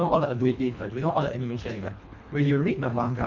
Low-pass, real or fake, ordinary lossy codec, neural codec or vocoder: 7.2 kHz; fake; none; codec, 24 kHz, 1.5 kbps, HILCodec